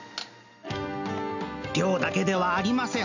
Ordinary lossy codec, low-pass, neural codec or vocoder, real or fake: none; 7.2 kHz; none; real